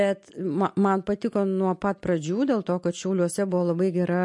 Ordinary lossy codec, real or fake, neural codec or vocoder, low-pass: MP3, 48 kbps; real; none; 10.8 kHz